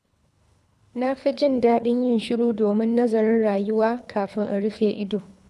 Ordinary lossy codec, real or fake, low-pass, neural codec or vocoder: none; fake; none; codec, 24 kHz, 3 kbps, HILCodec